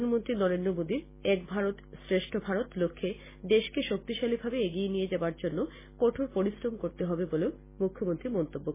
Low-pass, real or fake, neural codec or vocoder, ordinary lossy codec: 3.6 kHz; real; none; MP3, 16 kbps